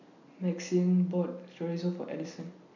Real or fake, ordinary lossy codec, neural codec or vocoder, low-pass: real; none; none; 7.2 kHz